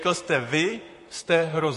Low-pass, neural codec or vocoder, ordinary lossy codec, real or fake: 14.4 kHz; vocoder, 44.1 kHz, 128 mel bands, Pupu-Vocoder; MP3, 48 kbps; fake